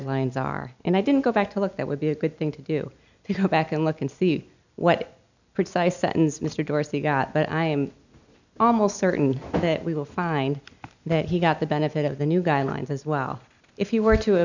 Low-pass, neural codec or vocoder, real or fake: 7.2 kHz; none; real